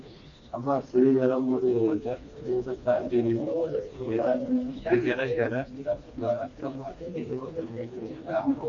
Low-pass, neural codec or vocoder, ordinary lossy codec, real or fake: 7.2 kHz; codec, 16 kHz, 2 kbps, FreqCodec, smaller model; MP3, 48 kbps; fake